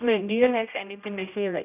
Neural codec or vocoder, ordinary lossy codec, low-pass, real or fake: codec, 16 kHz, 0.5 kbps, X-Codec, HuBERT features, trained on general audio; none; 3.6 kHz; fake